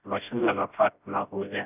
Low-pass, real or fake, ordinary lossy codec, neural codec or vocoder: 3.6 kHz; fake; none; codec, 16 kHz, 0.5 kbps, FreqCodec, smaller model